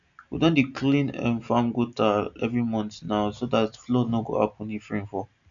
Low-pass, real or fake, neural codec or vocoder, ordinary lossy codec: 7.2 kHz; real; none; none